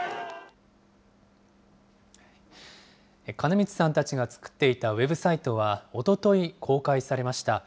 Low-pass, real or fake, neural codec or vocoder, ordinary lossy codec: none; real; none; none